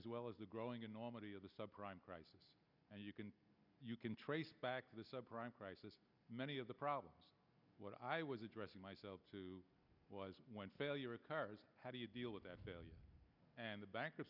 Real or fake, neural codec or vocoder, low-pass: real; none; 5.4 kHz